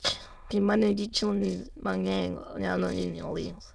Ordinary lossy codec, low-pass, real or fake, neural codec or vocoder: none; none; fake; autoencoder, 22.05 kHz, a latent of 192 numbers a frame, VITS, trained on many speakers